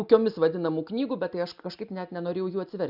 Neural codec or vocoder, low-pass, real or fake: none; 5.4 kHz; real